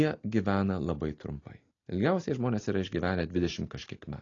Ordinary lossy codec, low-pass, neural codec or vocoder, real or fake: AAC, 32 kbps; 7.2 kHz; none; real